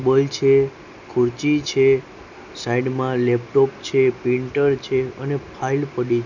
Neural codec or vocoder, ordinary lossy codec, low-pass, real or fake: none; none; 7.2 kHz; real